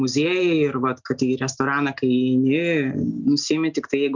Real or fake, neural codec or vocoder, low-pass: real; none; 7.2 kHz